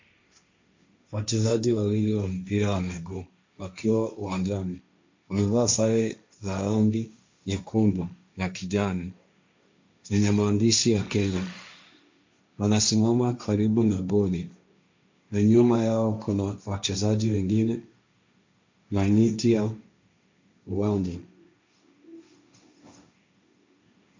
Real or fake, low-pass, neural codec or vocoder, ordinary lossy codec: fake; 7.2 kHz; codec, 16 kHz, 1.1 kbps, Voila-Tokenizer; MP3, 64 kbps